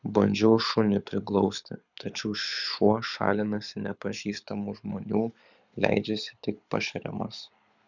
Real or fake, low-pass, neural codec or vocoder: fake; 7.2 kHz; codec, 24 kHz, 6 kbps, HILCodec